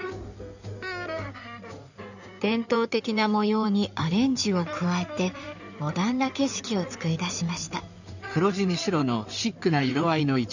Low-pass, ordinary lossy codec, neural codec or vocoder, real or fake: 7.2 kHz; none; codec, 16 kHz in and 24 kHz out, 2.2 kbps, FireRedTTS-2 codec; fake